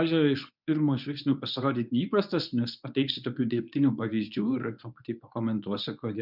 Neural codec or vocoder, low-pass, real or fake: codec, 24 kHz, 0.9 kbps, WavTokenizer, medium speech release version 1; 5.4 kHz; fake